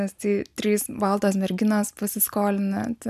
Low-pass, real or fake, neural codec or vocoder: 14.4 kHz; real; none